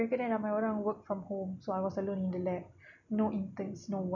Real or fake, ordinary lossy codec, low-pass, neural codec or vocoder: real; none; 7.2 kHz; none